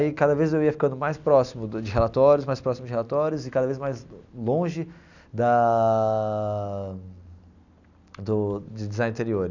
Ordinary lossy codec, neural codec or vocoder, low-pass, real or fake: none; none; 7.2 kHz; real